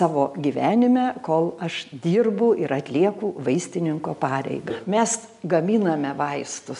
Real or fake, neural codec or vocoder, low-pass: real; none; 10.8 kHz